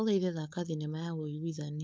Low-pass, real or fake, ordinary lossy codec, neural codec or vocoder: none; fake; none; codec, 16 kHz, 4.8 kbps, FACodec